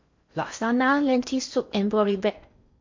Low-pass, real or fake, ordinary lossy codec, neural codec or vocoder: 7.2 kHz; fake; MP3, 48 kbps; codec, 16 kHz in and 24 kHz out, 0.6 kbps, FocalCodec, streaming, 4096 codes